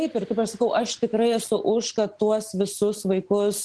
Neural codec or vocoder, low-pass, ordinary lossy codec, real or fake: none; 10.8 kHz; Opus, 16 kbps; real